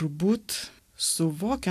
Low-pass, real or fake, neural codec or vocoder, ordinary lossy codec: 14.4 kHz; real; none; AAC, 96 kbps